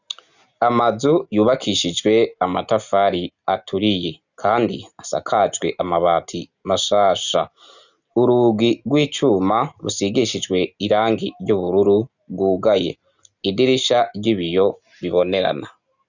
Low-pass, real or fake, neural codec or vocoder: 7.2 kHz; real; none